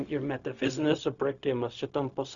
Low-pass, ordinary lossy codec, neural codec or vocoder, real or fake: 7.2 kHz; Opus, 64 kbps; codec, 16 kHz, 0.4 kbps, LongCat-Audio-Codec; fake